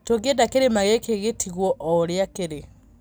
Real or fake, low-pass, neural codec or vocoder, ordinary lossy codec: real; none; none; none